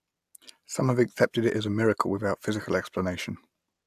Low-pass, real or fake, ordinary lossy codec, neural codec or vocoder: 14.4 kHz; real; AAC, 96 kbps; none